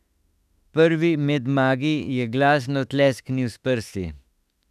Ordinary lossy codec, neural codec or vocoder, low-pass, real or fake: none; autoencoder, 48 kHz, 32 numbers a frame, DAC-VAE, trained on Japanese speech; 14.4 kHz; fake